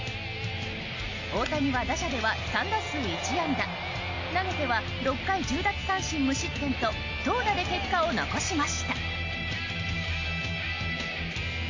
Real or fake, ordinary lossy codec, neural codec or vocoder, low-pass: real; AAC, 48 kbps; none; 7.2 kHz